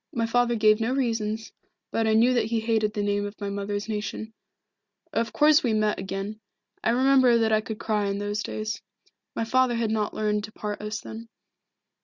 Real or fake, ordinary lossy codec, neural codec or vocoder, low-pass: real; Opus, 64 kbps; none; 7.2 kHz